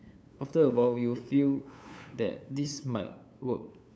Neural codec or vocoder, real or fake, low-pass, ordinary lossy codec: codec, 16 kHz, 8 kbps, FunCodec, trained on LibriTTS, 25 frames a second; fake; none; none